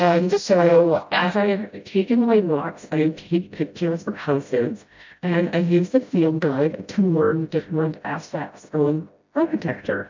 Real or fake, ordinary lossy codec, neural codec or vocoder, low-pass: fake; MP3, 64 kbps; codec, 16 kHz, 0.5 kbps, FreqCodec, smaller model; 7.2 kHz